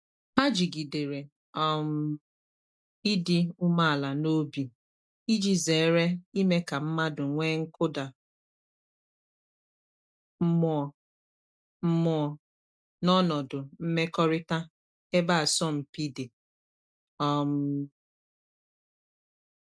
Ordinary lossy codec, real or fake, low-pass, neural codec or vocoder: none; real; none; none